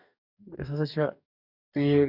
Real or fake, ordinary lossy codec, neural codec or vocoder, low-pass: fake; none; codec, 44.1 kHz, 2.6 kbps, DAC; 5.4 kHz